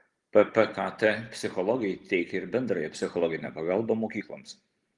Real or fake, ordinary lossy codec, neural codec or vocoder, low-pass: real; Opus, 24 kbps; none; 10.8 kHz